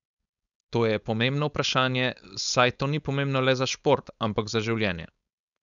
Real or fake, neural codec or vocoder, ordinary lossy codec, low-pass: fake; codec, 16 kHz, 4.8 kbps, FACodec; none; 7.2 kHz